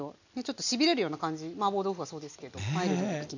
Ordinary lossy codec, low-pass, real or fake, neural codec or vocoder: none; 7.2 kHz; real; none